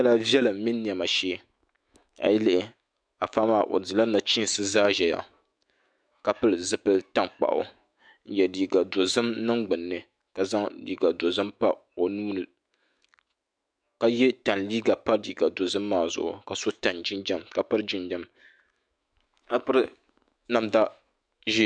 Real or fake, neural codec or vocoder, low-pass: fake; autoencoder, 48 kHz, 128 numbers a frame, DAC-VAE, trained on Japanese speech; 9.9 kHz